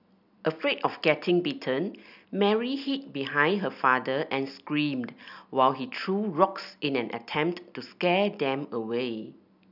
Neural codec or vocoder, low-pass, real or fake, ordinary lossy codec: none; 5.4 kHz; real; none